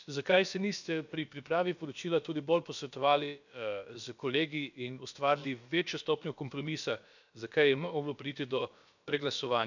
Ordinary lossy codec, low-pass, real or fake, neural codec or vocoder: none; 7.2 kHz; fake; codec, 16 kHz, about 1 kbps, DyCAST, with the encoder's durations